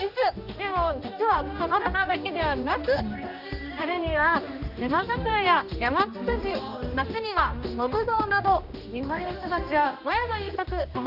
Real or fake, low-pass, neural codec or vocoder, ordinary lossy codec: fake; 5.4 kHz; codec, 16 kHz, 1 kbps, X-Codec, HuBERT features, trained on general audio; none